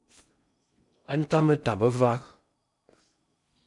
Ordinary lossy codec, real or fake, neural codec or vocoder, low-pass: MP3, 96 kbps; fake; codec, 16 kHz in and 24 kHz out, 0.6 kbps, FocalCodec, streaming, 2048 codes; 10.8 kHz